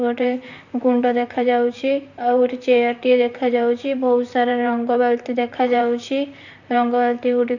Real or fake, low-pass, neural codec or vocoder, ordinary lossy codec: fake; 7.2 kHz; vocoder, 44.1 kHz, 128 mel bands, Pupu-Vocoder; none